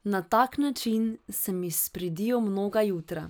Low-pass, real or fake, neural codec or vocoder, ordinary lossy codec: none; real; none; none